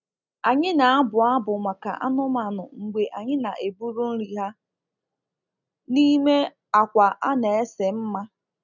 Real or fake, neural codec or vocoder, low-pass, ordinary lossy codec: real; none; 7.2 kHz; none